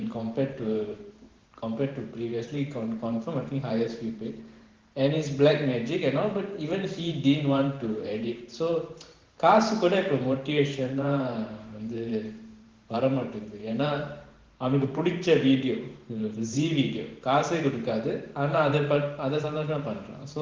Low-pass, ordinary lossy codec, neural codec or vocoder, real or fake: 7.2 kHz; Opus, 16 kbps; vocoder, 44.1 kHz, 128 mel bands every 512 samples, BigVGAN v2; fake